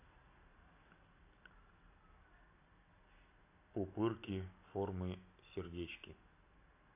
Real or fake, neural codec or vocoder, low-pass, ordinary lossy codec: real; none; 3.6 kHz; AAC, 24 kbps